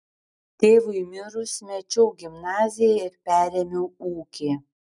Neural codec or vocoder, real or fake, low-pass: none; real; 10.8 kHz